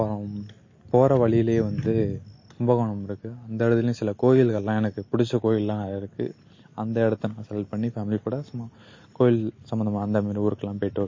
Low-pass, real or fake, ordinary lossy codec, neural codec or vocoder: 7.2 kHz; real; MP3, 32 kbps; none